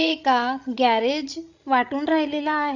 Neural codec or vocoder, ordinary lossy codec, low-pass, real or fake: codec, 16 kHz, 16 kbps, FreqCodec, larger model; none; 7.2 kHz; fake